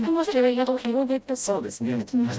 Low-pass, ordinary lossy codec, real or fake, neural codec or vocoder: none; none; fake; codec, 16 kHz, 0.5 kbps, FreqCodec, smaller model